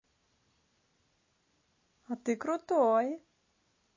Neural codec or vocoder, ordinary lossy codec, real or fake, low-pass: none; MP3, 32 kbps; real; 7.2 kHz